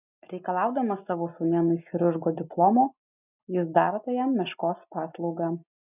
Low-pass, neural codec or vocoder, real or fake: 3.6 kHz; none; real